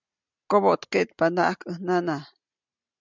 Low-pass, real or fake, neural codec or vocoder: 7.2 kHz; real; none